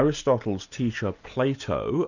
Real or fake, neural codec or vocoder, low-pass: real; none; 7.2 kHz